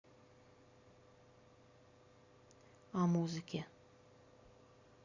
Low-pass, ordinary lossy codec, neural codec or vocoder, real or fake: 7.2 kHz; Opus, 64 kbps; vocoder, 44.1 kHz, 128 mel bands every 256 samples, BigVGAN v2; fake